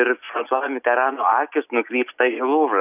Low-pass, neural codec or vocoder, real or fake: 3.6 kHz; none; real